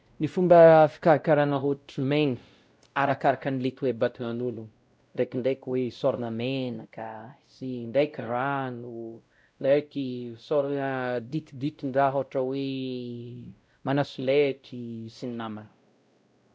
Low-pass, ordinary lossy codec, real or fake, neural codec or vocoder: none; none; fake; codec, 16 kHz, 0.5 kbps, X-Codec, WavLM features, trained on Multilingual LibriSpeech